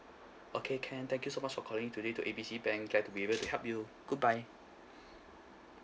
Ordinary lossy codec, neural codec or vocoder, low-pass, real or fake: none; none; none; real